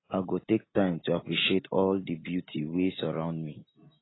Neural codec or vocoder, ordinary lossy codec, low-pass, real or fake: none; AAC, 16 kbps; 7.2 kHz; real